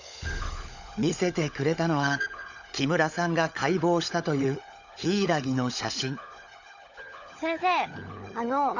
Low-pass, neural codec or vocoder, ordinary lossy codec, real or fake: 7.2 kHz; codec, 16 kHz, 16 kbps, FunCodec, trained on LibriTTS, 50 frames a second; none; fake